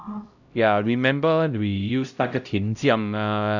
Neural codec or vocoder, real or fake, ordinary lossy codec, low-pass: codec, 16 kHz, 0.5 kbps, X-Codec, HuBERT features, trained on LibriSpeech; fake; none; 7.2 kHz